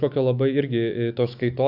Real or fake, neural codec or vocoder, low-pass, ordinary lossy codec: fake; autoencoder, 48 kHz, 128 numbers a frame, DAC-VAE, trained on Japanese speech; 5.4 kHz; AAC, 48 kbps